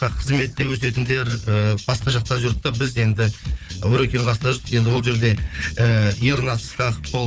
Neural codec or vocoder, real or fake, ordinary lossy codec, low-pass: codec, 16 kHz, 4 kbps, FunCodec, trained on Chinese and English, 50 frames a second; fake; none; none